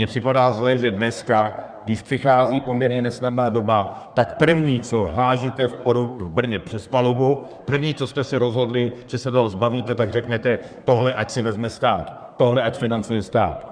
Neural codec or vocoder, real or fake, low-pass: codec, 24 kHz, 1 kbps, SNAC; fake; 9.9 kHz